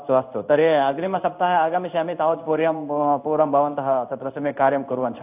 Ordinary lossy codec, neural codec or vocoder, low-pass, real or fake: AAC, 32 kbps; codec, 16 kHz in and 24 kHz out, 1 kbps, XY-Tokenizer; 3.6 kHz; fake